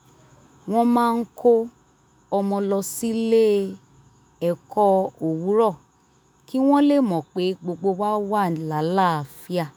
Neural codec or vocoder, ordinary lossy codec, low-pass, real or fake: autoencoder, 48 kHz, 128 numbers a frame, DAC-VAE, trained on Japanese speech; none; none; fake